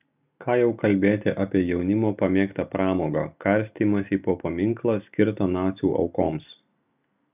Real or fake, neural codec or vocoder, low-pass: real; none; 3.6 kHz